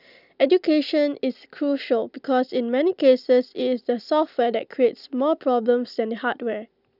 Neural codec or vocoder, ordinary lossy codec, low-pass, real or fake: none; none; 5.4 kHz; real